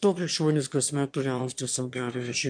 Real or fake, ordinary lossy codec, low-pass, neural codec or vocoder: fake; MP3, 64 kbps; 9.9 kHz; autoencoder, 22.05 kHz, a latent of 192 numbers a frame, VITS, trained on one speaker